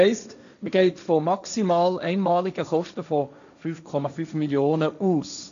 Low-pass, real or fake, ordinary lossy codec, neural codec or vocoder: 7.2 kHz; fake; none; codec, 16 kHz, 1.1 kbps, Voila-Tokenizer